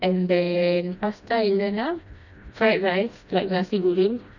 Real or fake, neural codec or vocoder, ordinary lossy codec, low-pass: fake; codec, 16 kHz, 1 kbps, FreqCodec, smaller model; none; 7.2 kHz